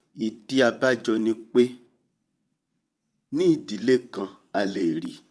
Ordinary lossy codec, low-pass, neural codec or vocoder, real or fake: none; none; vocoder, 22.05 kHz, 80 mel bands, WaveNeXt; fake